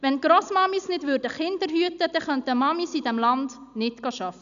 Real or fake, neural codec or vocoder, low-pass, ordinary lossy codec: real; none; 7.2 kHz; none